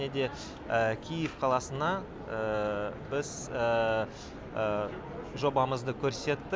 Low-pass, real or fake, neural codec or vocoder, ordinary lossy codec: none; real; none; none